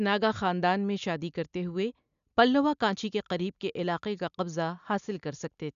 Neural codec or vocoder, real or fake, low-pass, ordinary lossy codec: none; real; 7.2 kHz; none